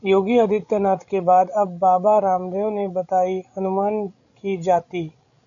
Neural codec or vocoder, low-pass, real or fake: codec, 16 kHz, 16 kbps, FreqCodec, larger model; 7.2 kHz; fake